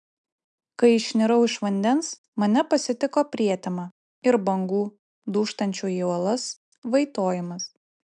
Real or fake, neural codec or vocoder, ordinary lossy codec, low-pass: real; none; MP3, 96 kbps; 9.9 kHz